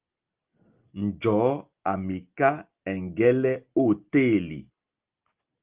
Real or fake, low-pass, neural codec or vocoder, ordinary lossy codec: real; 3.6 kHz; none; Opus, 32 kbps